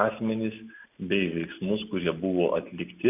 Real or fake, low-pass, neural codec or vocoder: real; 3.6 kHz; none